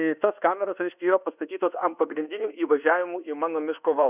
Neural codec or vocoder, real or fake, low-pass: codec, 24 kHz, 1.2 kbps, DualCodec; fake; 3.6 kHz